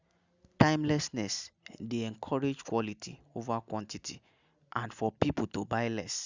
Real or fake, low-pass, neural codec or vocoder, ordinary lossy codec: real; 7.2 kHz; none; Opus, 64 kbps